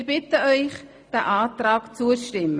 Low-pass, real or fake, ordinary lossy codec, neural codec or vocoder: none; real; none; none